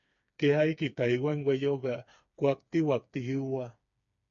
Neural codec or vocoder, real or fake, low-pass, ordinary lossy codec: codec, 16 kHz, 4 kbps, FreqCodec, smaller model; fake; 7.2 kHz; MP3, 48 kbps